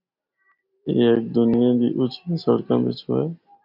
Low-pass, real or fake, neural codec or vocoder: 5.4 kHz; real; none